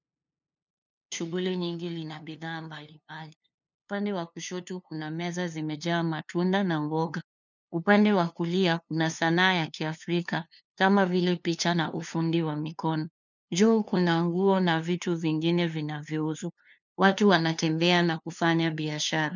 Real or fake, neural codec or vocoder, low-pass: fake; codec, 16 kHz, 2 kbps, FunCodec, trained on LibriTTS, 25 frames a second; 7.2 kHz